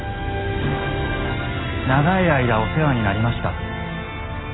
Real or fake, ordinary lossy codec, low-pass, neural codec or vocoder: real; AAC, 16 kbps; 7.2 kHz; none